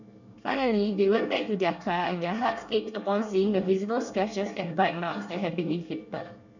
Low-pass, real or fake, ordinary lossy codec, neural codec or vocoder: 7.2 kHz; fake; none; codec, 24 kHz, 1 kbps, SNAC